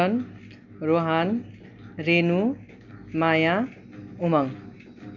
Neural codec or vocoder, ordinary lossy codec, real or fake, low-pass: none; none; real; 7.2 kHz